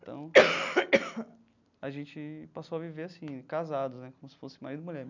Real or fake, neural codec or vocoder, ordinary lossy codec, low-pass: real; none; none; 7.2 kHz